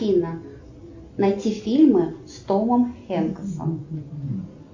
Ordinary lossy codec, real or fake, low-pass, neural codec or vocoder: AAC, 48 kbps; real; 7.2 kHz; none